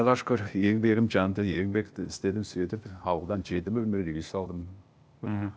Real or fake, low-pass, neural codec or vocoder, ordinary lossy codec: fake; none; codec, 16 kHz, 0.8 kbps, ZipCodec; none